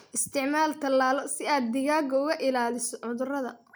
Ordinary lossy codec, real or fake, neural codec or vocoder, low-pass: none; real; none; none